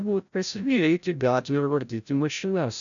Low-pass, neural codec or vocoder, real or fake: 7.2 kHz; codec, 16 kHz, 0.5 kbps, FreqCodec, larger model; fake